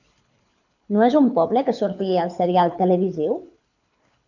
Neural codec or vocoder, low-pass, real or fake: codec, 24 kHz, 6 kbps, HILCodec; 7.2 kHz; fake